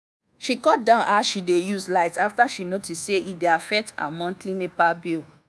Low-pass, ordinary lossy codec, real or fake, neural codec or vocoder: none; none; fake; codec, 24 kHz, 1.2 kbps, DualCodec